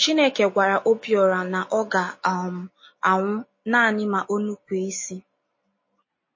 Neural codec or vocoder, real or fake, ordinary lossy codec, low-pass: none; real; MP3, 32 kbps; 7.2 kHz